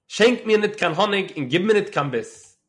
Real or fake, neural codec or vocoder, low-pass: real; none; 10.8 kHz